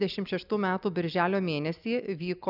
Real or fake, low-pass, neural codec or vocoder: real; 5.4 kHz; none